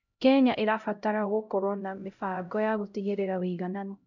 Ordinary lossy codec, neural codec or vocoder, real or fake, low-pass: none; codec, 16 kHz, 0.5 kbps, X-Codec, HuBERT features, trained on LibriSpeech; fake; 7.2 kHz